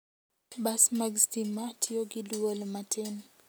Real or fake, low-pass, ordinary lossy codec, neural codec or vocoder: real; none; none; none